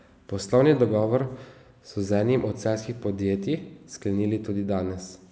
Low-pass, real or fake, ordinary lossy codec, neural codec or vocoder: none; real; none; none